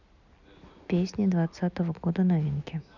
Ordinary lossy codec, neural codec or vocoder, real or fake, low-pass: none; none; real; 7.2 kHz